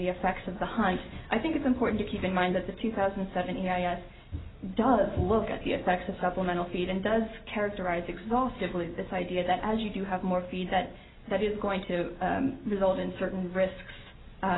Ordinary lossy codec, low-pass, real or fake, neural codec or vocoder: AAC, 16 kbps; 7.2 kHz; real; none